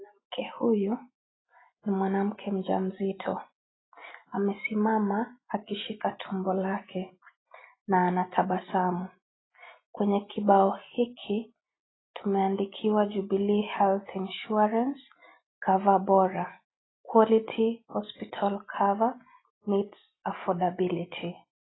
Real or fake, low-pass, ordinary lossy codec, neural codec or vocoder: real; 7.2 kHz; AAC, 16 kbps; none